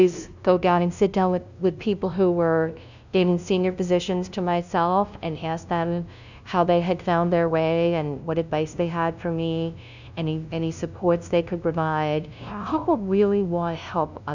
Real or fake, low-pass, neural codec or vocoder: fake; 7.2 kHz; codec, 16 kHz, 0.5 kbps, FunCodec, trained on LibriTTS, 25 frames a second